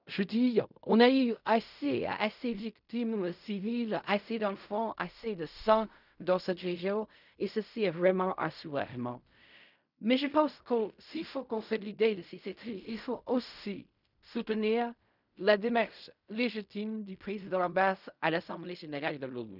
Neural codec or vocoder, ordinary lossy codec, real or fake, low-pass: codec, 16 kHz in and 24 kHz out, 0.4 kbps, LongCat-Audio-Codec, fine tuned four codebook decoder; none; fake; 5.4 kHz